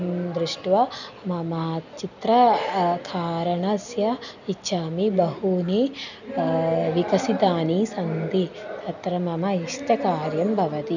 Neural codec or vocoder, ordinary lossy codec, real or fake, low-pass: none; none; real; 7.2 kHz